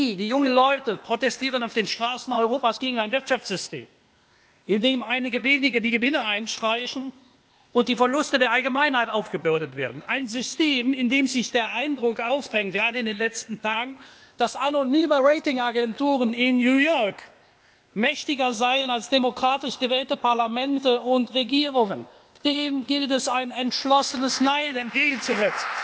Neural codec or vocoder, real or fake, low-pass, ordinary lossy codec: codec, 16 kHz, 0.8 kbps, ZipCodec; fake; none; none